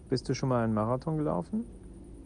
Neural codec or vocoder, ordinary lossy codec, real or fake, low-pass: none; Opus, 32 kbps; real; 9.9 kHz